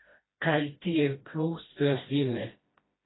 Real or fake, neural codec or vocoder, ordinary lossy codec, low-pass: fake; codec, 16 kHz, 1 kbps, FreqCodec, smaller model; AAC, 16 kbps; 7.2 kHz